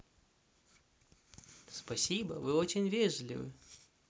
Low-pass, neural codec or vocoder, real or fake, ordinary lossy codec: none; none; real; none